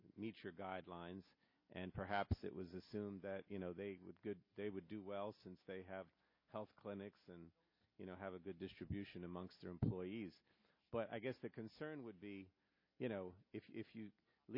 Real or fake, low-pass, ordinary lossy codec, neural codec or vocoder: real; 5.4 kHz; MP3, 24 kbps; none